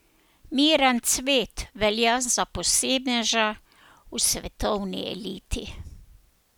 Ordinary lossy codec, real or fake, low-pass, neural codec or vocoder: none; real; none; none